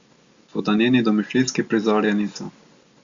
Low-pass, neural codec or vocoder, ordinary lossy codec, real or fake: 7.2 kHz; none; Opus, 64 kbps; real